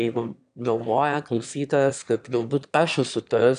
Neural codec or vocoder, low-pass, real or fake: autoencoder, 22.05 kHz, a latent of 192 numbers a frame, VITS, trained on one speaker; 9.9 kHz; fake